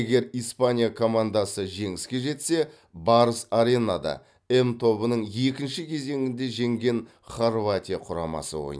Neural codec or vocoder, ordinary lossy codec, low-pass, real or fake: none; none; none; real